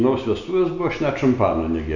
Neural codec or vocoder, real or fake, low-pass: none; real; 7.2 kHz